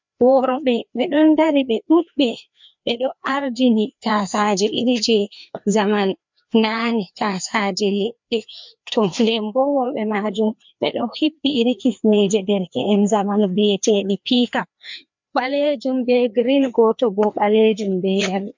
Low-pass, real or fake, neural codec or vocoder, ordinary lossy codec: 7.2 kHz; fake; codec, 16 kHz, 2 kbps, FreqCodec, larger model; MP3, 64 kbps